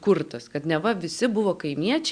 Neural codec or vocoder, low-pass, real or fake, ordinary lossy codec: none; 9.9 kHz; real; Opus, 64 kbps